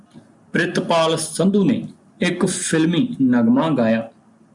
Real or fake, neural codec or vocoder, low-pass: real; none; 10.8 kHz